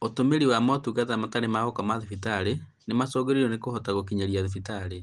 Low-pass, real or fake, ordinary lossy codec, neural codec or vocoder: 10.8 kHz; real; Opus, 24 kbps; none